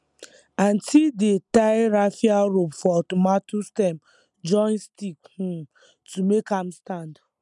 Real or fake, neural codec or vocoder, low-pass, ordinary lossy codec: real; none; 10.8 kHz; none